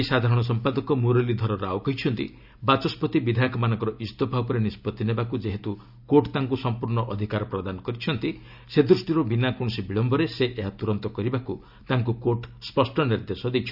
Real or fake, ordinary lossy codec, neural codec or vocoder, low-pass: real; none; none; 5.4 kHz